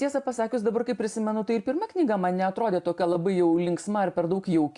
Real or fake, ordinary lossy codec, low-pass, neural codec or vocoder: real; AAC, 64 kbps; 10.8 kHz; none